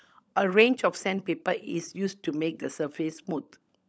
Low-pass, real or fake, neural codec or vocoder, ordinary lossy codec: none; fake; codec, 16 kHz, 16 kbps, FunCodec, trained on LibriTTS, 50 frames a second; none